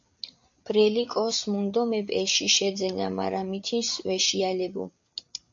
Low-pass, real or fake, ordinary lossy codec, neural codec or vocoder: 7.2 kHz; fake; MP3, 48 kbps; codec, 16 kHz, 8 kbps, FreqCodec, larger model